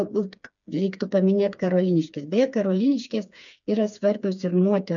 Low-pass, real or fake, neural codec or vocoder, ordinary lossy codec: 7.2 kHz; fake; codec, 16 kHz, 4 kbps, FreqCodec, smaller model; MP3, 96 kbps